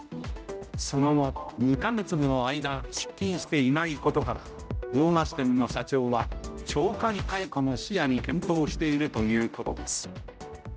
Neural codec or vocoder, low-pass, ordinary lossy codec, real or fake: codec, 16 kHz, 0.5 kbps, X-Codec, HuBERT features, trained on general audio; none; none; fake